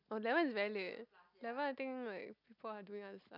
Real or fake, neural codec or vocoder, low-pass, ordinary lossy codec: real; none; 5.4 kHz; none